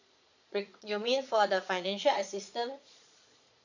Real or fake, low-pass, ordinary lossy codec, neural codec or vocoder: fake; 7.2 kHz; none; vocoder, 22.05 kHz, 80 mel bands, Vocos